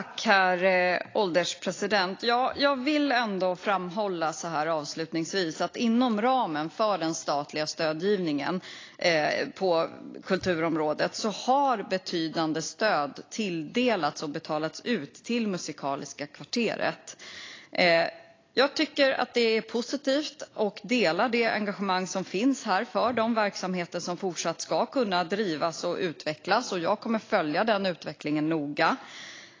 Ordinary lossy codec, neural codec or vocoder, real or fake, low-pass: AAC, 32 kbps; none; real; 7.2 kHz